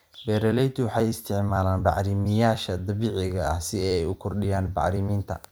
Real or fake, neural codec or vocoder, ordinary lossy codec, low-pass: fake; vocoder, 44.1 kHz, 128 mel bands every 256 samples, BigVGAN v2; none; none